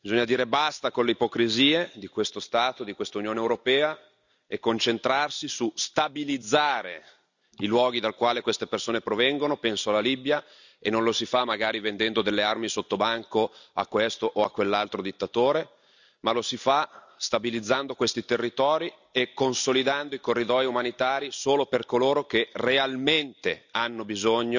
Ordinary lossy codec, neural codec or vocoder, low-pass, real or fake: none; none; 7.2 kHz; real